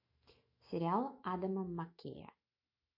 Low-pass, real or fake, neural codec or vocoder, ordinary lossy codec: 5.4 kHz; fake; autoencoder, 48 kHz, 128 numbers a frame, DAC-VAE, trained on Japanese speech; MP3, 32 kbps